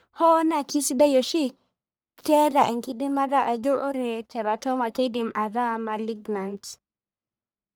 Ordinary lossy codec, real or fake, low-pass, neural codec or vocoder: none; fake; none; codec, 44.1 kHz, 1.7 kbps, Pupu-Codec